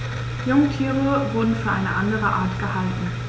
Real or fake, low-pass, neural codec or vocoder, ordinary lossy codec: real; none; none; none